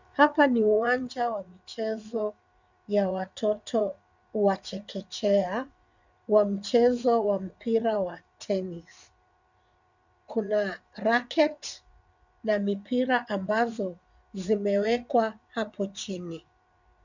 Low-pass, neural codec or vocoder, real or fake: 7.2 kHz; vocoder, 44.1 kHz, 80 mel bands, Vocos; fake